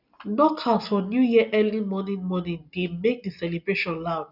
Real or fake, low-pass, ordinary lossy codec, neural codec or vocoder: real; 5.4 kHz; none; none